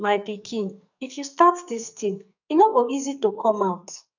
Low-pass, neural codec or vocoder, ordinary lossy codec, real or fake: 7.2 kHz; codec, 44.1 kHz, 2.6 kbps, SNAC; none; fake